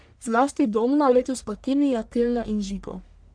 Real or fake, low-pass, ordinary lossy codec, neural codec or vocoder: fake; 9.9 kHz; MP3, 96 kbps; codec, 44.1 kHz, 1.7 kbps, Pupu-Codec